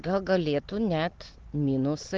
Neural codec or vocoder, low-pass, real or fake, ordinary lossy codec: none; 7.2 kHz; real; Opus, 16 kbps